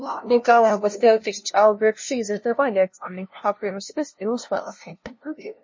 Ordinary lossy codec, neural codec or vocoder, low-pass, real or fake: MP3, 32 kbps; codec, 16 kHz, 0.5 kbps, FunCodec, trained on LibriTTS, 25 frames a second; 7.2 kHz; fake